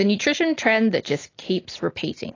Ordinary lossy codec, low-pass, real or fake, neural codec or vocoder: AAC, 32 kbps; 7.2 kHz; real; none